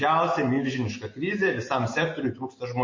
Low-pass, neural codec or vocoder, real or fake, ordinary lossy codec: 7.2 kHz; none; real; MP3, 32 kbps